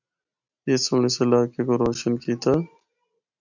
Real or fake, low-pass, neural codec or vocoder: real; 7.2 kHz; none